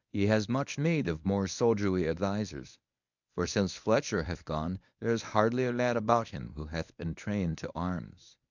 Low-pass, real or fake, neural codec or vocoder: 7.2 kHz; fake; codec, 24 kHz, 0.9 kbps, WavTokenizer, medium speech release version 1